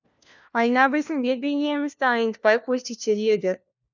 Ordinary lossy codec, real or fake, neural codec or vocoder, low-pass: none; fake; codec, 16 kHz, 1 kbps, FunCodec, trained on LibriTTS, 50 frames a second; 7.2 kHz